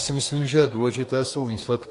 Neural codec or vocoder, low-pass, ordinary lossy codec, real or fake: codec, 24 kHz, 1 kbps, SNAC; 10.8 kHz; AAC, 48 kbps; fake